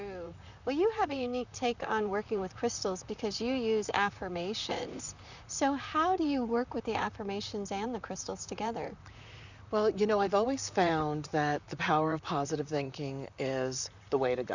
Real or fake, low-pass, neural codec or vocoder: fake; 7.2 kHz; vocoder, 44.1 kHz, 128 mel bands, Pupu-Vocoder